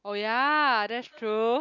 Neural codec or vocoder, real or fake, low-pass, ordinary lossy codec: none; real; 7.2 kHz; none